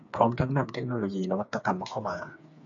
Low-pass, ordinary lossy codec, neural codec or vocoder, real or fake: 7.2 kHz; AAC, 64 kbps; codec, 16 kHz, 4 kbps, FreqCodec, smaller model; fake